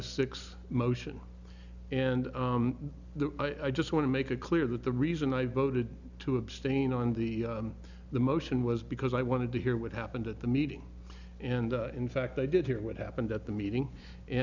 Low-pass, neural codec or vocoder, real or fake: 7.2 kHz; none; real